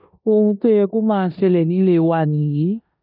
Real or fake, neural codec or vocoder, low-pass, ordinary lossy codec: fake; codec, 16 kHz in and 24 kHz out, 0.9 kbps, LongCat-Audio-Codec, four codebook decoder; 5.4 kHz; none